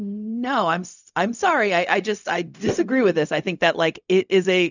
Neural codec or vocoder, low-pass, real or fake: codec, 16 kHz, 0.4 kbps, LongCat-Audio-Codec; 7.2 kHz; fake